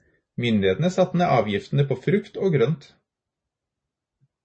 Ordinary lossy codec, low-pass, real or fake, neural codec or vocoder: MP3, 32 kbps; 9.9 kHz; real; none